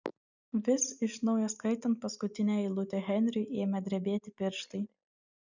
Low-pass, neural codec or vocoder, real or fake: 7.2 kHz; none; real